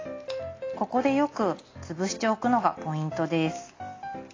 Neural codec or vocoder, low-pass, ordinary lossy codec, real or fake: none; 7.2 kHz; AAC, 32 kbps; real